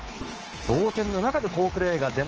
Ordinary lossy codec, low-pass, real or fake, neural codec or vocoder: Opus, 16 kbps; 7.2 kHz; fake; codec, 24 kHz, 3.1 kbps, DualCodec